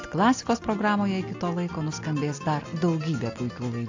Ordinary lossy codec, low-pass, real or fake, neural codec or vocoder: AAC, 48 kbps; 7.2 kHz; real; none